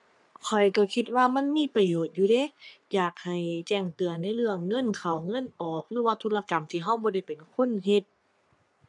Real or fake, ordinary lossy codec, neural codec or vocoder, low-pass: fake; none; codec, 44.1 kHz, 3.4 kbps, Pupu-Codec; 10.8 kHz